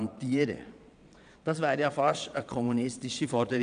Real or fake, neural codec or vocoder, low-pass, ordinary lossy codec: fake; vocoder, 22.05 kHz, 80 mel bands, Vocos; 9.9 kHz; none